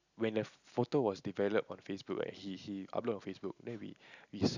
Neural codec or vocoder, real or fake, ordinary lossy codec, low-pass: none; real; none; 7.2 kHz